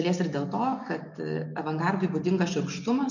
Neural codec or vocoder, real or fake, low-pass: none; real; 7.2 kHz